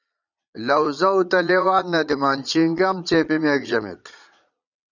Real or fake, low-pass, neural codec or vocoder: fake; 7.2 kHz; vocoder, 22.05 kHz, 80 mel bands, Vocos